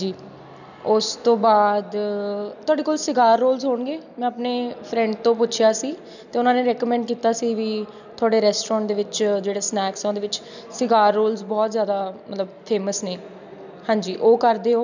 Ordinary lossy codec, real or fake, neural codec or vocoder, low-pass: none; real; none; 7.2 kHz